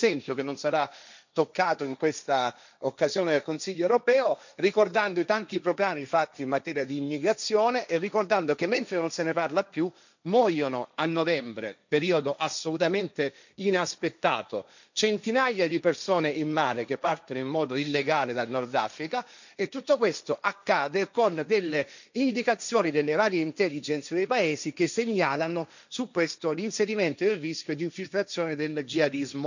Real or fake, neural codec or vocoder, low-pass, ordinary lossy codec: fake; codec, 16 kHz, 1.1 kbps, Voila-Tokenizer; 7.2 kHz; none